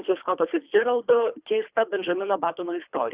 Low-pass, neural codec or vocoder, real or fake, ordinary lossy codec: 3.6 kHz; codec, 24 kHz, 3 kbps, HILCodec; fake; Opus, 64 kbps